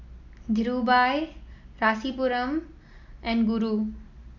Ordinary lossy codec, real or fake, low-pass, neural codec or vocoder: none; real; 7.2 kHz; none